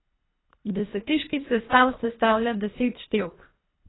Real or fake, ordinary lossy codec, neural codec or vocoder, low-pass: fake; AAC, 16 kbps; codec, 24 kHz, 1.5 kbps, HILCodec; 7.2 kHz